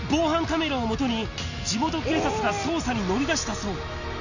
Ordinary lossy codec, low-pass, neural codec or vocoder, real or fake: none; 7.2 kHz; none; real